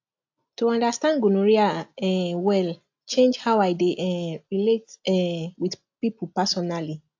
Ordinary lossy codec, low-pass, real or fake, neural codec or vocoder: AAC, 48 kbps; 7.2 kHz; real; none